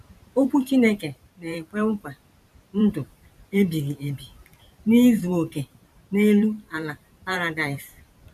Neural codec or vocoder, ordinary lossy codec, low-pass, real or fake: vocoder, 44.1 kHz, 128 mel bands every 512 samples, BigVGAN v2; none; 14.4 kHz; fake